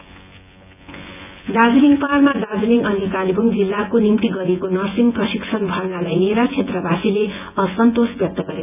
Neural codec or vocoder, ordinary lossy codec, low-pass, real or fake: vocoder, 24 kHz, 100 mel bands, Vocos; none; 3.6 kHz; fake